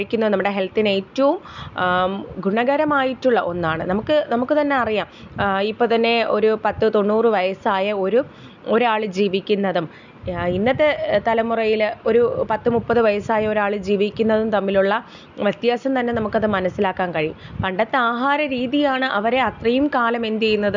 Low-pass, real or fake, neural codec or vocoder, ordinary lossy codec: 7.2 kHz; real; none; none